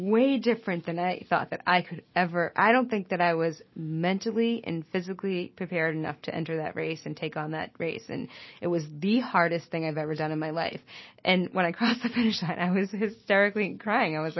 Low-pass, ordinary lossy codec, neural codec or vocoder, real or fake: 7.2 kHz; MP3, 24 kbps; none; real